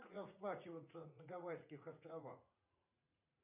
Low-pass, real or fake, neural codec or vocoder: 3.6 kHz; fake; vocoder, 44.1 kHz, 80 mel bands, Vocos